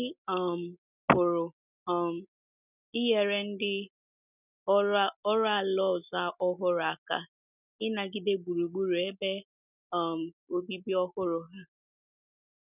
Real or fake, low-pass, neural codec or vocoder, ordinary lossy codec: real; 3.6 kHz; none; none